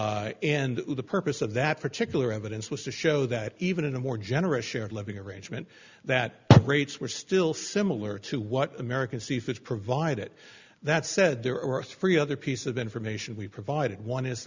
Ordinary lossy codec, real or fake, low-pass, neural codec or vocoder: Opus, 64 kbps; real; 7.2 kHz; none